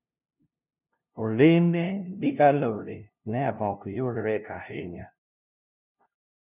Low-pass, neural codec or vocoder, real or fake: 3.6 kHz; codec, 16 kHz, 0.5 kbps, FunCodec, trained on LibriTTS, 25 frames a second; fake